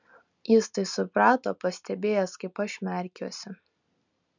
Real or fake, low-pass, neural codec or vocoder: real; 7.2 kHz; none